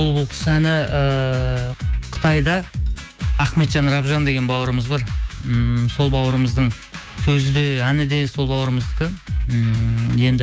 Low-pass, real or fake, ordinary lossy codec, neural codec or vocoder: none; fake; none; codec, 16 kHz, 6 kbps, DAC